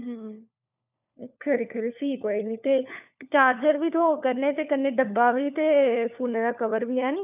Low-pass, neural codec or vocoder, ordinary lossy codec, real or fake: 3.6 kHz; codec, 16 kHz, 4 kbps, FunCodec, trained on LibriTTS, 50 frames a second; none; fake